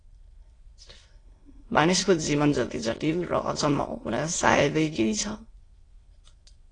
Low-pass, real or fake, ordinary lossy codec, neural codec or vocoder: 9.9 kHz; fake; AAC, 32 kbps; autoencoder, 22.05 kHz, a latent of 192 numbers a frame, VITS, trained on many speakers